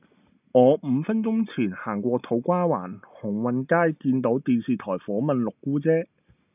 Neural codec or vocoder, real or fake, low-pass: none; real; 3.6 kHz